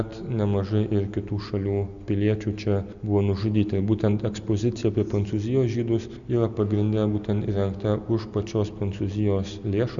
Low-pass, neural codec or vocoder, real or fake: 7.2 kHz; none; real